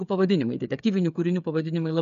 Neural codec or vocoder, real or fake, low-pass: codec, 16 kHz, 8 kbps, FreqCodec, smaller model; fake; 7.2 kHz